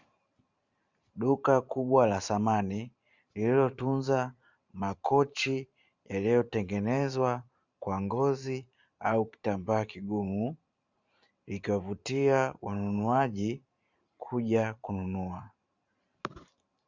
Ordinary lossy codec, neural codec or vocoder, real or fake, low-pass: Opus, 64 kbps; vocoder, 44.1 kHz, 128 mel bands every 512 samples, BigVGAN v2; fake; 7.2 kHz